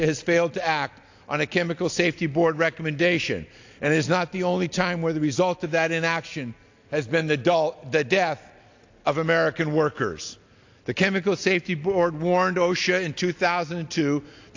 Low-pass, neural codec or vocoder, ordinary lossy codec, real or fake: 7.2 kHz; none; AAC, 48 kbps; real